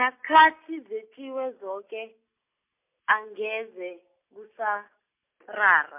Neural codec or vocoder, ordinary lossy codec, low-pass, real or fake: none; MP3, 32 kbps; 3.6 kHz; real